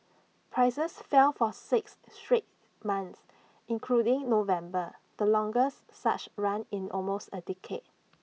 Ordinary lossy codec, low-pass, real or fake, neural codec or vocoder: none; none; real; none